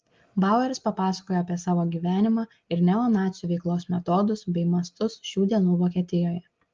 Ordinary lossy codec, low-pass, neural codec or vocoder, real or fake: Opus, 24 kbps; 7.2 kHz; none; real